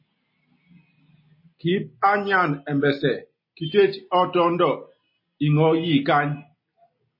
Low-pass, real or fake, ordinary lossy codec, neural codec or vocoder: 5.4 kHz; real; MP3, 24 kbps; none